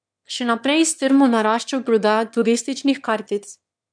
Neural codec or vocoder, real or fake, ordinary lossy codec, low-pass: autoencoder, 22.05 kHz, a latent of 192 numbers a frame, VITS, trained on one speaker; fake; none; 9.9 kHz